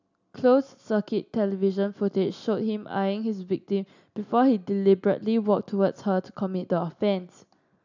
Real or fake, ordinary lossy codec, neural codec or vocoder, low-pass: real; none; none; 7.2 kHz